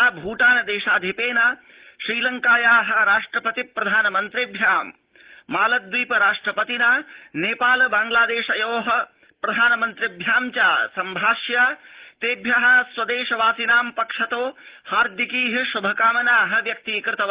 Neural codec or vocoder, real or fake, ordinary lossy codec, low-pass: none; real; Opus, 16 kbps; 3.6 kHz